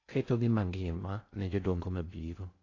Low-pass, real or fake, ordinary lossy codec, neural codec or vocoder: 7.2 kHz; fake; AAC, 32 kbps; codec, 16 kHz in and 24 kHz out, 0.6 kbps, FocalCodec, streaming, 2048 codes